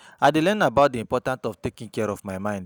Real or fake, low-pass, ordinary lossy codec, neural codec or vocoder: real; none; none; none